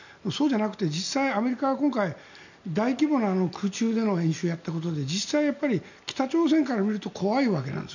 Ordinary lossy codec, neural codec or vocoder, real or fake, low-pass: none; none; real; 7.2 kHz